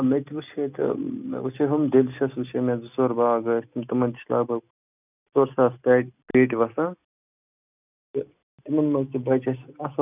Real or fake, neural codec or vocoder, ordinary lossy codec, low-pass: real; none; none; 3.6 kHz